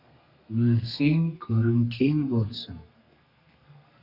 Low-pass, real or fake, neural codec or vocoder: 5.4 kHz; fake; codec, 32 kHz, 1.9 kbps, SNAC